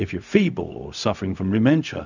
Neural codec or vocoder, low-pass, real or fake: codec, 16 kHz, 0.4 kbps, LongCat-Audio-Codec; 7.2 kHz; fake